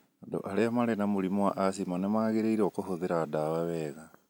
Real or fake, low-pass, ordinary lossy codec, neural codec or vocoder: real; 19.8 kHz; none; none